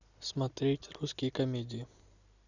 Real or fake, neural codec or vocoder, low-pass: real; none; 7.2 kHz